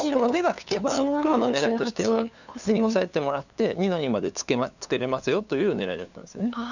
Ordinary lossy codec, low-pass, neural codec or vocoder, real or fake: none; 7.2 kHz; codec, 16 kHz, 2 kbps, FunCodec, trained on LibriTTS, 25 frames a second; fake